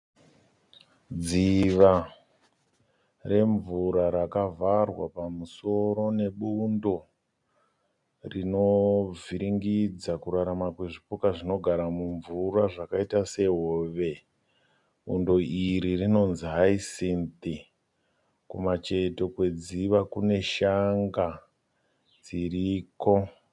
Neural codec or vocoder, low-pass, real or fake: none; 10.8 kHz; real